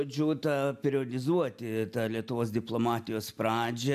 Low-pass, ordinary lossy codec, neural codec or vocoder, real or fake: 14.4 kHz; AAC, 96 kbps; none; real